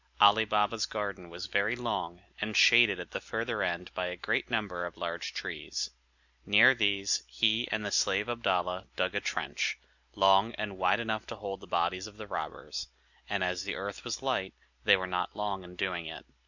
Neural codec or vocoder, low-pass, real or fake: none; 7.2 kHz; real